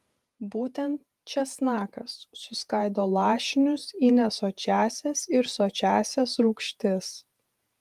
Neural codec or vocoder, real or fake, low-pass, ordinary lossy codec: vocoder, 48 kHz, 128 mel bands, Vocos; fake; 14.4 kHz; Opus, 32 kbps